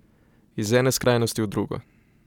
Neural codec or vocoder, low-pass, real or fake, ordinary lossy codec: none; 19.8 kHz; real; none